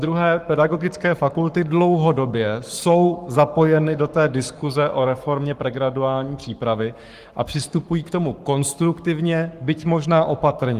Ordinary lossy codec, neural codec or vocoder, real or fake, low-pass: Opus, 24 kbps; codec, 44.1 kHz, 7.8 kbps, Pupu-Codec; fake; 14.4 kHz